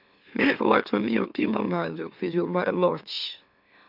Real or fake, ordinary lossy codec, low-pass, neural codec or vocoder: fake; none; 5.4 kHz; autoencoder, 44.1 kHz, a latent of 192 numbers a frame, MeloTTS